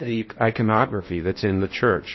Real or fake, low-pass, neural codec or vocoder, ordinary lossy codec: fake; 7.2 kHz; codec, 16 kHz in and 24 kHz out, 0.8 kbps, FocalCodec, streaming, 65536 codes; MP3, 24 kbps